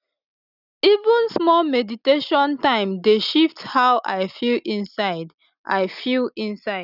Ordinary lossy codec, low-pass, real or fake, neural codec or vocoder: none; 5.4 kHz; real; none